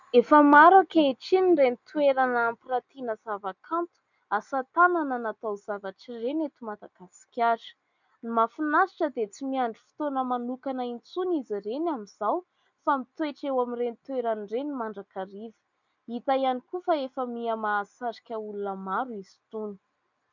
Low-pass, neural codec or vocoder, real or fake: 7.2 kHz; none; real